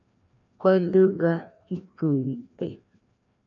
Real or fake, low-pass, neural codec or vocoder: fake; 7.2 kHz; codec, 16 kHz, 1 kbps, FreqCodec, larger model